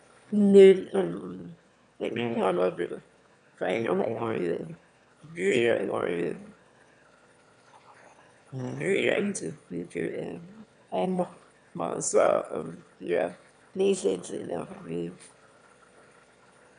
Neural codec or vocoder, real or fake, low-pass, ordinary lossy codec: autoencoder, 22.05 kHz, a latent of 192 numbers a frame, VITS, trained on one speaker; fake; 9.9 kHz; none